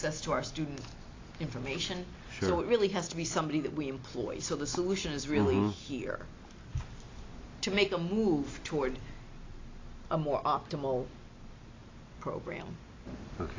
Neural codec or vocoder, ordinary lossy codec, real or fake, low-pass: none; AAC, 32 kbps; real; 7.2 kHz